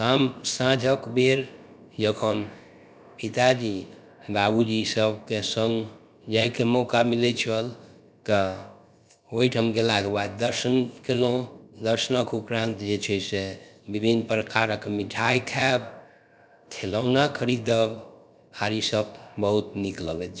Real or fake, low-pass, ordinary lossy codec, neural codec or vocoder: fake; none; none; codec, 16 kHz, about 1 kbps, DyCAST, with the encoder's durations